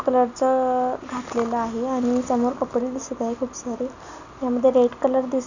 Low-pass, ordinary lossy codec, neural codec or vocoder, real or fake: 7.2 kHz; none; none; real